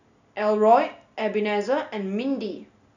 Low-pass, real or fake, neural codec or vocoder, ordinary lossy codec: 7.2 kHz; real; none; none